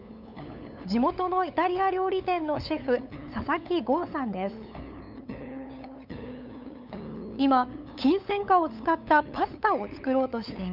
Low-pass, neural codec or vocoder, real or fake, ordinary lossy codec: 5.4 kHz; codec, 16 kHz, 8 kbps, FunCodec, trained on LibriTTS, 25 frames a second; fake; none